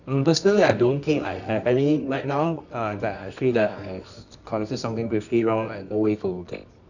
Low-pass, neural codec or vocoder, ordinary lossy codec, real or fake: 7.2 kHz; codec, 24 kHz, 0.9 kbps, WavTokenizer, medium music audio release; none; fake